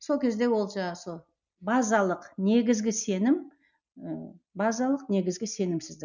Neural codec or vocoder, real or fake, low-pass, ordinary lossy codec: none; real; 7.2 kHz; none